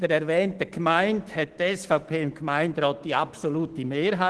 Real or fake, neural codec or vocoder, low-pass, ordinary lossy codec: fake; autoencoder, 48 kHz, 128 numbers a frame, DAC-VAE, trained on Japanese speech; 10.8 kHz; Opus, 16 kbps